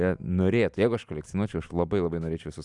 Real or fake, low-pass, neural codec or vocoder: real; 10.8 kHz; none